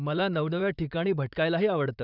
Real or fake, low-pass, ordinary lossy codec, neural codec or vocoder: fake; 5.4 kHz; none; vocoder, 44.1 kHz, 128 mel bands, Pupu-Vocoder